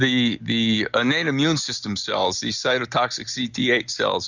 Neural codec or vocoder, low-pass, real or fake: none; 7.2 kHz; real